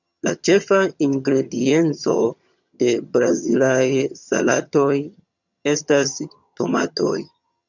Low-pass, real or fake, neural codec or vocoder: 7.2 kHz; fake; vocoder, 22.05 kHz, 80 mel bands, HiFi-GAN